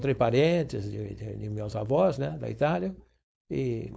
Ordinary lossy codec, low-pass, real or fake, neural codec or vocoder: none; none; fake; codec, 16 kHz, 4.8 kbps, FACodec